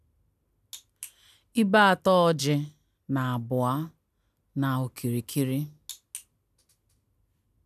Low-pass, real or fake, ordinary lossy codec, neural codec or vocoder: 14.4 kHz; real; none; none